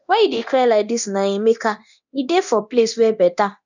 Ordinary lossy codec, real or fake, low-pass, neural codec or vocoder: none; fake; 7.2 kHz; codec, 24 kHz, 0.9 kbps, DualCodec